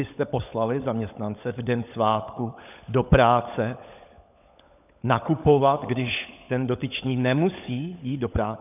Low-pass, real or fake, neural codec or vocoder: 3.6 kHz; fake; codec, 16 kHz, 16 kbps, FunCodec, trained on LibriTTS, 50 frames a second